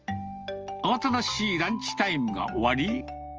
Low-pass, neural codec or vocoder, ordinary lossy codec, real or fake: 7.2 kHz; none; Opus, 24 kbps; real